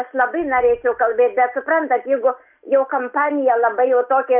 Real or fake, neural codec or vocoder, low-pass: real; none; 3.6 kHz